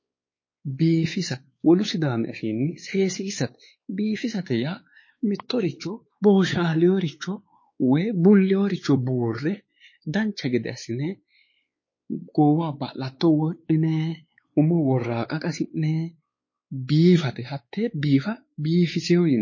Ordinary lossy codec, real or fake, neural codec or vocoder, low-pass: MP3, 32 kbps; fake; codec, 16 kHz, 4 kbps, X-Codec, WavLM features, trained on Multilingual LibriSpeech; 7.2 kHz